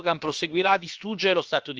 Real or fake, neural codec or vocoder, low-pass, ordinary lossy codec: fake; codec, 16 kHz, about 1 kbps, DyCAST, with the encoder's durations; 7.2 kHz; Opus, 24 kbps